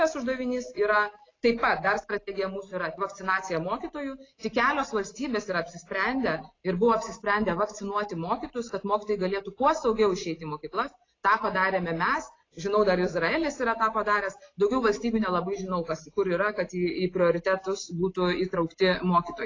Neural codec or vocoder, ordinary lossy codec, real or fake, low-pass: none; AAC, 32 kbps; real; 7.2 kHz